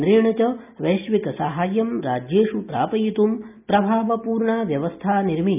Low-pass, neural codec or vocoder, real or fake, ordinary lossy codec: 3.6 kHz; none; real; none